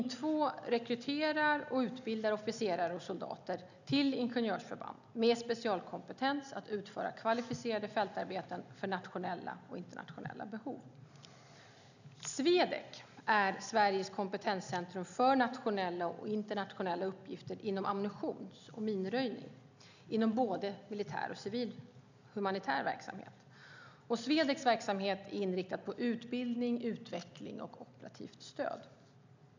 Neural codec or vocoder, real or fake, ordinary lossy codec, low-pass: none; real; none; 7.2 kHz